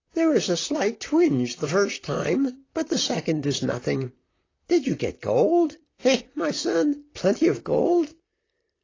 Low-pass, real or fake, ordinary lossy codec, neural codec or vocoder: 7.2 kHz; fake; AAC, 32 kbps; vocoder, 44.1 kHz, 128 mel bands, Pupu-Vocoder